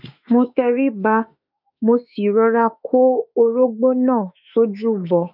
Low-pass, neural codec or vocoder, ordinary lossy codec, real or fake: 5.4 kHz; autoencoder, 48 kHz, 32 numbers a frame, DAC-VAE, trained on Japanese speech; none; fake